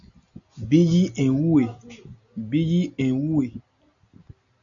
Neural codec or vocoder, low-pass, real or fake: none; 7.2 kHz; real